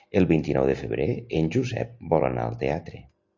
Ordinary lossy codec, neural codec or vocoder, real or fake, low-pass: AAC, 48 kbps; none; real; 7.2 kHz